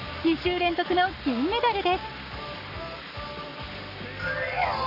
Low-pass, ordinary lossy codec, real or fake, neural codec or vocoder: 5.4 kHz; none; fake; codec, 44.1 kHz, 7.8 kbps, Pupu-Codec